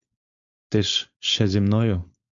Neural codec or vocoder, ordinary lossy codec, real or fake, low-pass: codec, 16 kHz, 4.8 kbps, FACodec; MP3, 64 kbps; fake; 7.2 kHz